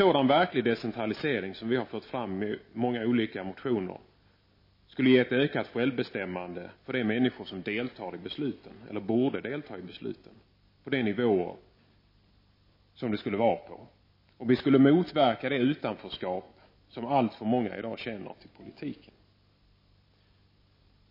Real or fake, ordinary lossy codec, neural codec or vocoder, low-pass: real; MP3, 24 kbps; none; 5.4 kHz